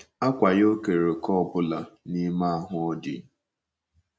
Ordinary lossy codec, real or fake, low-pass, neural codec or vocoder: none; real; none; none